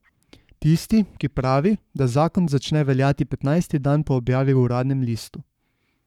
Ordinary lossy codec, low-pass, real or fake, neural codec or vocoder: none; 19.8 kHz; fake; codec, 44.1 kHz, 7.8 kbps, Pupu-Codec